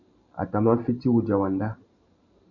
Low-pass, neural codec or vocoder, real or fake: 7.2 kHz; vocoder, 44.1 kHz, 128 mel bands every 512 samples, BigVGAN v2; fake